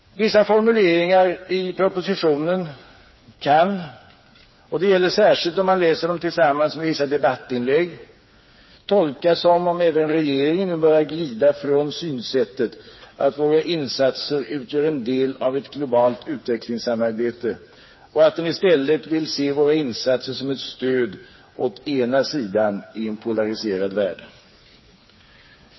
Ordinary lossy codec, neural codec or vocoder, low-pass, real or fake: MP3, 24 kbps; codec, 16 kHz, 4 kbps, FreqCodec, smaller model; 7.2 kHz; fake